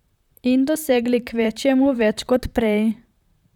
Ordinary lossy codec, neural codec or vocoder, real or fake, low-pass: none; vocoder, 44.1 kHz, 128 mel bands, Pupu-Vocoder; fake; 19.8 kHz